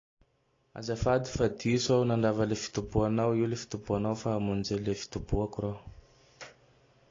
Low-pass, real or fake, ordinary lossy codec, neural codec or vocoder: 7.2 kHz; real; AAC, 32 kbps; none